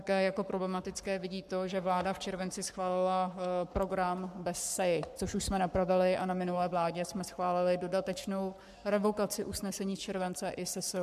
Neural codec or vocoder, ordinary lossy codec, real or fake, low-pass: codec, 44.1 kHz, 7.8 kbps, Pupu-Codec; MP3, 96 kbps; fake; 14.4 kHz